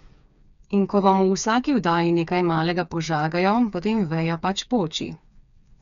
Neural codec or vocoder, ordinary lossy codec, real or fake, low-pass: codec, 16 kHz, 4 kbps, FreqCodec, smaller model; none; fake; 7.2 kHz